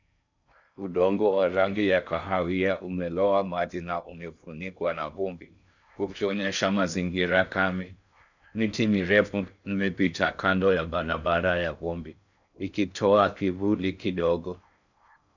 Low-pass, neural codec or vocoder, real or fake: 7.2 kHz; codec, 16 kHz in and 24 kHz out, 0.6 kbps, FocalCodec, streaming, 4096 codes; fake